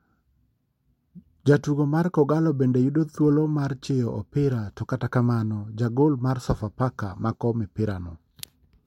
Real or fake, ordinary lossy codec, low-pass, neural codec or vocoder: real; MP3, 64 kbps; 19.8 kHz; none